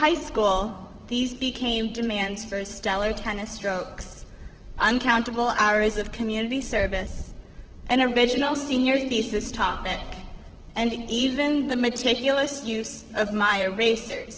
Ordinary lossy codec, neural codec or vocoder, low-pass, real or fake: Opus, 16 kbps; vocoder, 44.1 kHz, 128 mel bands, Pupu-Vocoder; 7.2 kHz; fake